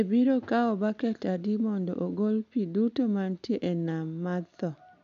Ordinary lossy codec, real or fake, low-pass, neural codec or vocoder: MP3, 64 kbps; real; 7.2 kHz; none